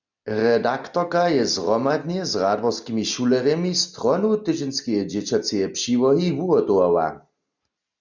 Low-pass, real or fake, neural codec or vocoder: 7.2 kHz; real; none